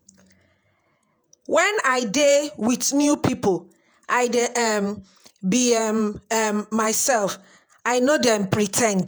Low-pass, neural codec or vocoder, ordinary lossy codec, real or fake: none; vocoder, 48 kHz, 128 mel bands, Vocos; none; fake